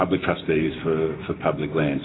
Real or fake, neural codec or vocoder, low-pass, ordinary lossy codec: real; none; 7.2 kHz; AAC, 16 kbps